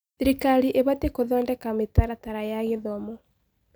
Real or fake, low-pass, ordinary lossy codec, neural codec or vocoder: real; none; none; none